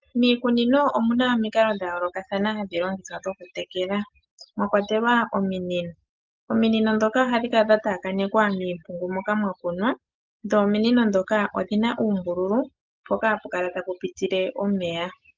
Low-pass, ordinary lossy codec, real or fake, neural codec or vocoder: 7.2 kHz; Opus, 32 kbps; real; none